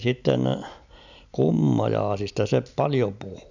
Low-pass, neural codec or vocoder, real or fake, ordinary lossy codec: 7.2 kHz; none; real; none